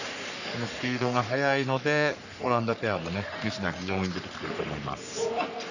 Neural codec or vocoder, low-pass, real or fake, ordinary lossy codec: codec, 44.1 kHz, 3.4 kbps, Pupu-Codec; 7.2 kHz; fake; none